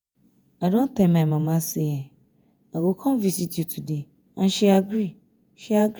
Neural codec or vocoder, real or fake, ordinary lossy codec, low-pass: vocoder, 48 kHz, 128 mel bands, Vocos; fake; none; none